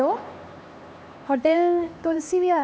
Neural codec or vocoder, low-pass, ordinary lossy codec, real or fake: codec, 16 kHz, 2 kbps, X-Codec, HuBERT features, trained on LibriSpeech; none; none; fake